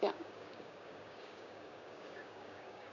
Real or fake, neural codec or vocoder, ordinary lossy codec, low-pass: fake; codec, 16 kHz, 6 kbps, DAC; none; 7.2 kHz